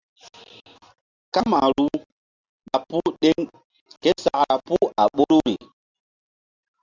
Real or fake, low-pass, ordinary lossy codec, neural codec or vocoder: real; 7.2 kHz; Opus, 64 kbps; none